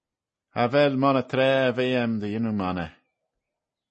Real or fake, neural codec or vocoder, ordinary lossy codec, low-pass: real; none; MP3, 32 kbps; 10.8 kHz